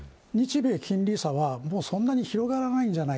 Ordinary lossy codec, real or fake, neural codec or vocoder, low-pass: none; real; none; none